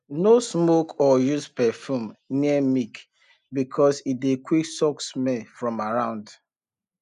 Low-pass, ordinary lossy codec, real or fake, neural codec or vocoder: 10.8 kHz; none; real; none